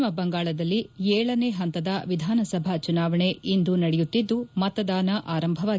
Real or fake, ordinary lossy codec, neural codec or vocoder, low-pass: real; none; none; none